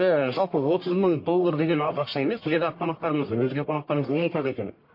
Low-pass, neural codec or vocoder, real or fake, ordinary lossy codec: 5.4 kHz; codec, 44.1 kHz, 1.7 kbps, Pupu-Codec; fake; MP3, 32 kbps